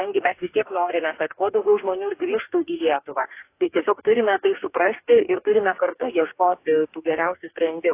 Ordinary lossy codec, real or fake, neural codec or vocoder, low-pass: AAC, 24 kbps; fake; codec, 44.1 kHz, 2.6 kbps, DAC; 3.6 kHz